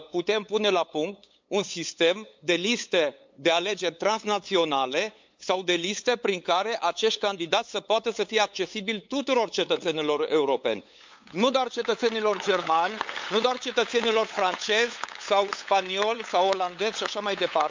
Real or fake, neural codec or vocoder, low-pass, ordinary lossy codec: fake; codec, 16 kHz, 8 kbps, FunCodec, trained on LibriTTS, 25 frames a second; 7.2 kHz; MP3, 64 kbps